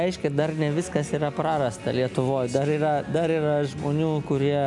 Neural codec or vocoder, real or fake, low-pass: none; real; 10.8 kHz